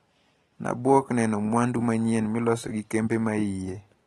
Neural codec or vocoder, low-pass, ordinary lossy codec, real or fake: none; 19.8 kHz; AAC, 32 kbps; real